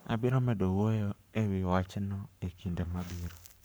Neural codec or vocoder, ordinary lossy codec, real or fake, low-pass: codec, 44.1 kHz, 7.8 kbps, Pupu-Codec; none; fake; none